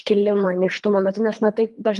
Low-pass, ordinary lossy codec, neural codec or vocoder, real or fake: 10.8 kHz; Opus, 32 kbps; codec, 24 kHz, 3 kbps, HILCodec; fake